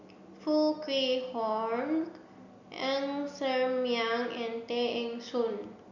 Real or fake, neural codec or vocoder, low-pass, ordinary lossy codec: real; none; 7.2 kHz; none